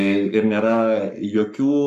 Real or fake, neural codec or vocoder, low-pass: fake; codec, 44.1 kHz, 7.8 kbps, Pupu-Codec; 14.4 kHz